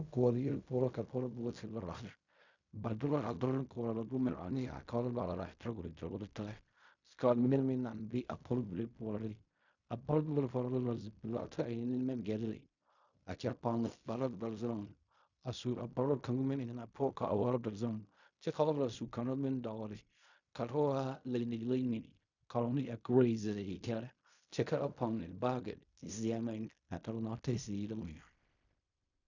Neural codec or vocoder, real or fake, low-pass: codec, 16 kHz in and 24 kHz out, 0.4 kbps, LongCat-Audio-Codec, fine tuned four codebook decoder; fake; 7.2 kHz